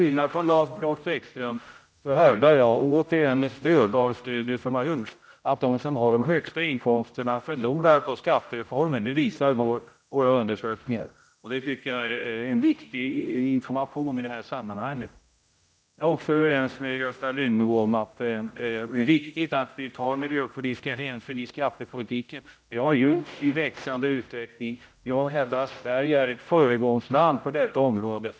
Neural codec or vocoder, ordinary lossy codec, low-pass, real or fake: codec, 16 kHz, 0.5 kbps, X-Codec, HuBERT features, trained on general audio; none; none; fake